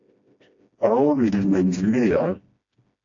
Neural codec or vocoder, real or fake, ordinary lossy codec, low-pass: codec, 16 kHz, 1 kbps, FreqCodec, smaller model; fake; AAC, 48 kbps; 7.2 kHz